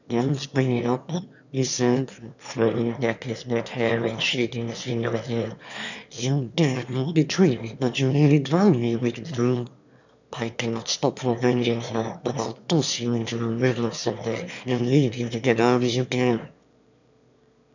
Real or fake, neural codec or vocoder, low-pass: fake; autoencoder, 22.05 kHz, a latent of 192 numbers a frame, VITS, trained on one speaker; 7.2 kHz